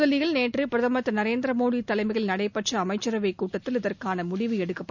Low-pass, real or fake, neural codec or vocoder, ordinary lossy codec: 7.2 kHz; real; none; none